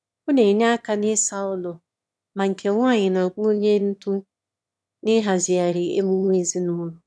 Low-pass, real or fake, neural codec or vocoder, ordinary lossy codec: none; fake; autoencoder, 22.05 kHz, a latent of 192 numbers a frame, VITS, trained on one speaker; none